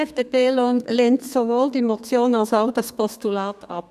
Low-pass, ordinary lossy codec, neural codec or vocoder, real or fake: 14.4 kHz; none; codec, 32 kHz, 1.9 kbps, SNAC; fake